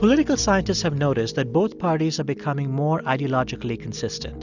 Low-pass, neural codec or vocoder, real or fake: 7.2 kHz; none; real